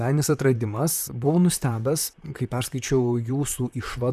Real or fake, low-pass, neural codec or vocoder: fake; 14.4 kHz; vocoder, 44.1 kHz, 128 mel bands, Pupu-Vocoder